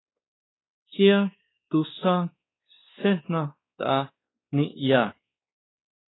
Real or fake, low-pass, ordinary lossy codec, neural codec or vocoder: fake; 7.2 kHz; AAC, 16 kbps; codec, 16 kHz, 2 kbps, X-Codec, WavLM features, trained on Multilingual LibriSpeech